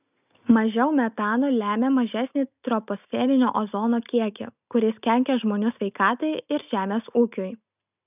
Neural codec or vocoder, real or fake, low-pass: none; real; 3.6 kHz